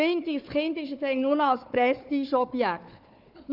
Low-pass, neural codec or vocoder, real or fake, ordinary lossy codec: 5.4 kHz; codec, 16 kHz, 4 kbps, FunCodec, trained on Chinese and English, 50 frames a second; fake; none